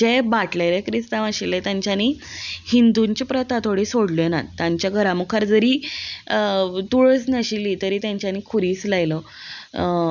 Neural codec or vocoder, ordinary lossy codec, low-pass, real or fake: none; none; 7.2 kHz; real